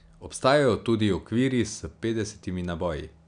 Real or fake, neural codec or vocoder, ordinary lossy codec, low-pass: real; none; none; 9.9 kHz